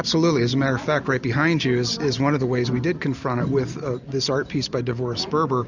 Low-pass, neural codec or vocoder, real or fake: 7.2 kHz; none; real